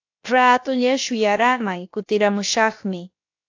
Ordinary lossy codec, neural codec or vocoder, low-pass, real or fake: AAC, 48 kbps; codec, 16 kHz, about 1 kbps, DyCAST, with the encoder's durations; 7.2 kHz; fake